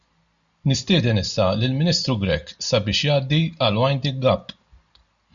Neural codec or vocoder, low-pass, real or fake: none; 7.2 kHz; real